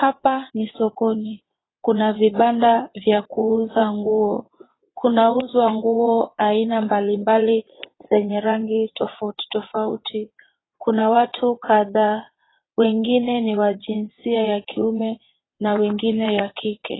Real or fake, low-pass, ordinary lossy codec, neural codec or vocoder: fake; 7.2 kHz; AAC, 16 kbps; vocoder, 22.05 kHz, 80 mel bands, WaveNeXt